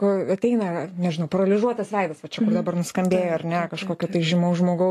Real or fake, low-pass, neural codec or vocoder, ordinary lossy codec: real; 14.4 kHz; none; AAC, 48 kbps